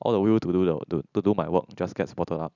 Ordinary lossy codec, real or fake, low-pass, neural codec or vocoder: none; real; 7.2 kHz; none